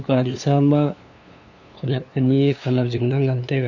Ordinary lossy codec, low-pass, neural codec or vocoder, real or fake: none; 7.2 kHz; codec, 16 kHz, 2 kbps, FunCodec, trained on LibriTTS, 25 frames a second; fake